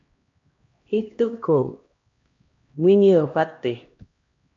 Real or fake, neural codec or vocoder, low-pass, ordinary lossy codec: fake; codec, 16 kHz, 1 kbps, X-Codec, HuBERT features, trained on LibriSpeech; 7.2 kHz; MP3, 48 kbps